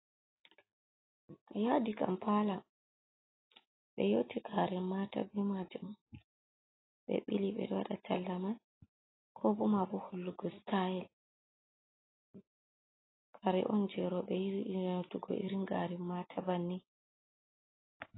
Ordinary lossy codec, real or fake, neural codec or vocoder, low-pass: AAC, 16 kbps; real; none; 7.2 kHz